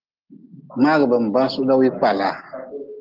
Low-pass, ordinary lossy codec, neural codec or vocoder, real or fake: 5.4 kHz; Opus, 16 kbps; none; real